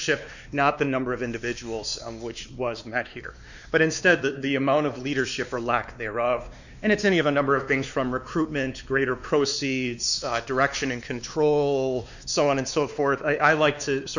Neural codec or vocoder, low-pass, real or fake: codec, 16 kHz, 2 kbps, X-Codec, WavLM features, trained on Multilingual LibriSpeech; 7.2 kHz; fake